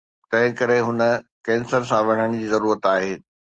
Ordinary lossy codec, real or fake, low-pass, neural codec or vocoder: Opus, 24 kbps; real; 9.9 kHz; none